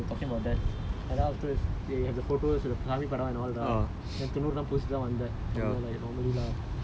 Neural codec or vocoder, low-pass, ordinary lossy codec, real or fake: none; none; none; real